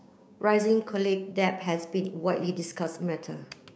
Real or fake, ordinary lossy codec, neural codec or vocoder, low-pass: fake; none; codec, 16 kHz, 6 kbps, DAC; none